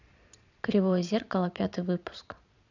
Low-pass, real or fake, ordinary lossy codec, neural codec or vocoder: 7.2 kHz; fake; none; vocoder, 22.05 kHz, 80 mel bands, Vocos